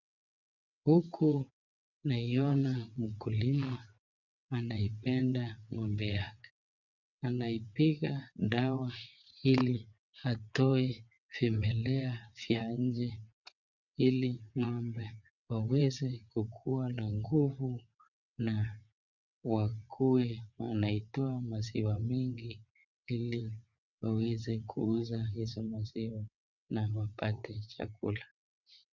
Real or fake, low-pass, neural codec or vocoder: fake; 7.2 kHz; vocoder, 22.05 kHz, 80 mel bands, WaveNeXt